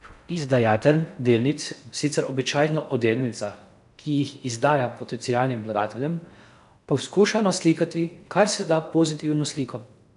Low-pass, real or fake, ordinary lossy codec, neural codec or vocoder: 10.8 kHz; fake; none; codec, 16 kHz in and 24 kHz out, 0.6 kbps, FocalCodec, streaming, 4096 codes